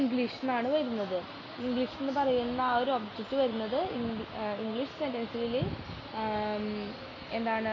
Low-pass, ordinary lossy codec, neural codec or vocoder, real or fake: 7.2 kHz; AAC, 32 kbps; none; real